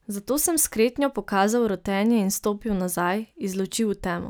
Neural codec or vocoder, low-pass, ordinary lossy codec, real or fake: none; none; none; real